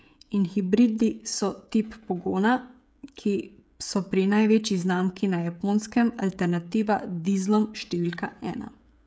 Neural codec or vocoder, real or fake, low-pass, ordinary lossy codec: codec, 16 kHz, 16 kbps, FreqCodec, smaller model; fake; none; none